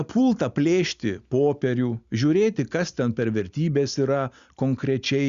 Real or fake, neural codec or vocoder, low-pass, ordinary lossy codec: real; none; 7.2 kHz; Opus, 64 kbps